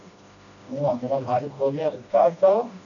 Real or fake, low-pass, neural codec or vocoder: fake; 7.2 kHz; codec, 16 kHz, 1 kbps, FreqCodec, smaller model